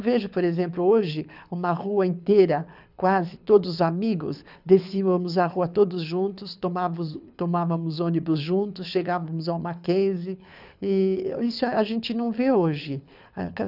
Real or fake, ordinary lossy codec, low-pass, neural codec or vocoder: fake; none; 5.4 kHz; codec, 24 kHz, 6 kbps, HILCodec